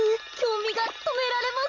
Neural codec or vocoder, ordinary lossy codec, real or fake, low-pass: none; none; real; 7.2 kHz